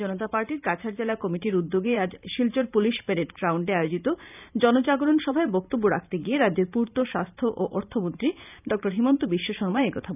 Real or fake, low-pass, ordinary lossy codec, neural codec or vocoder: fake; 3.6 kHz; none; vocoder, 44.1 kHz, 128 mel bands every 512 samples, BigVGAN v2